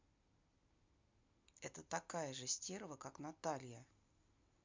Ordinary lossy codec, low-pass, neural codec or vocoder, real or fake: MP3, 64 kbps; 7.2 kHz; none; real